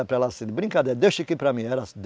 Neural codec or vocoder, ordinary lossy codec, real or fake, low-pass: none; none; real; none